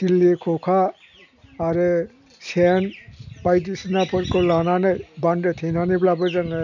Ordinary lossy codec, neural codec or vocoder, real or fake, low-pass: none; none; real; 7.2 kHz